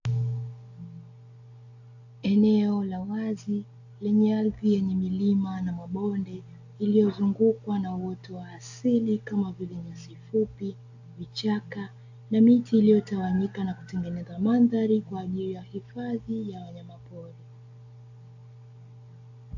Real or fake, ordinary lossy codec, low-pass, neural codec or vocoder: real; AAC, 48 kbps; 7.2 kHz; none